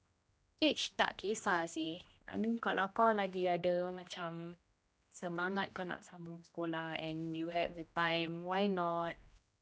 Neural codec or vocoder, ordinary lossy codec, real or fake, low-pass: codec, 16 kHz, 1 kbps, X-Codec, HuBERT features, trained on general audio; none; fake; none